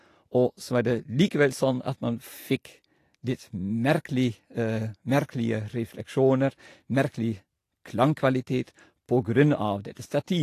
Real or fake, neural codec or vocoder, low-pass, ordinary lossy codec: real; none; 14.4 kHz; AAC, 48 kbps